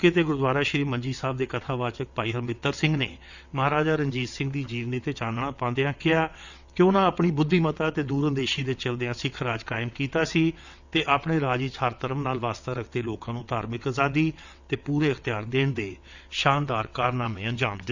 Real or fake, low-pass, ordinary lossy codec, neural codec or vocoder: fake; 7.2 kHz; none; vocoder, 22.05 kHz, 80 mel bands, WaveNeXt